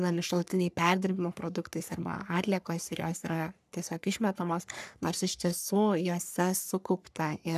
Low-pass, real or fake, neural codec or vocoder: 14.4 kHz; fake; codec, 44.1 kHz, 3.4 kbps, Pupu-Codec